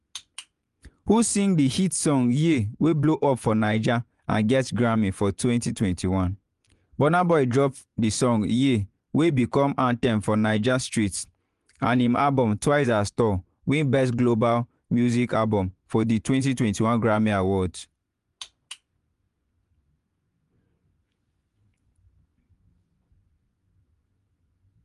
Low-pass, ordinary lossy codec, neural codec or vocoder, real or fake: 10.8 kHz; Opus, 32 kbps; vocoder, 24 kHz, 100 mel bands, Vocos; fake